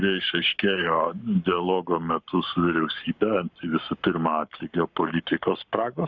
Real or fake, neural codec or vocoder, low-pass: fake; codec, 44.1 kHz, 7.8 kbps, Pupu-Codec; 7.2 kHz